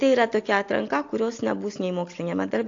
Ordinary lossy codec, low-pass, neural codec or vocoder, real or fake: AAC, 48 kbps; 7.2 kHz; none; real